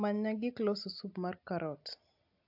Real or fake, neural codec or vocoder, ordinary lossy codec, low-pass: real; none; AAC, 48 kbps; 5.4 kHz